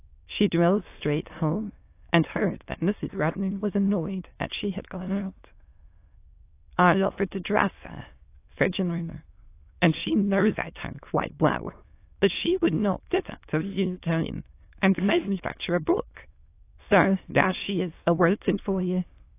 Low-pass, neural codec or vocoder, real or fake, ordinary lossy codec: 3.6 kHz; autoencoder, 22.05 kHz, a latent of 192 numbers a frame, VITS, trained on many speakers; fake; AAC, 24 kbps